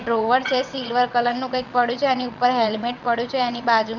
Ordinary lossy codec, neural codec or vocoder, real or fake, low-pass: none; vocoder, 22.05 kHz, 80 mel bands, WaveNeXt; fake; 7.2 kHz